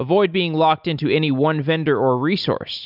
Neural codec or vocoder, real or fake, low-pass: none; real; 5.4 kHz